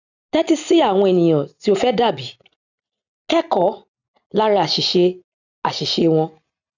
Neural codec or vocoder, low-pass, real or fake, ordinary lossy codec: none; 7.2 kHz; real; none